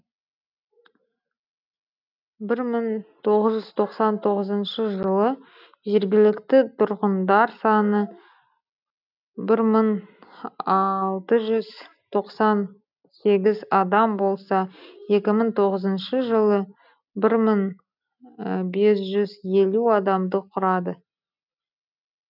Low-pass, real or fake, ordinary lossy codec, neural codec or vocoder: 5.4 kHz; real; none; none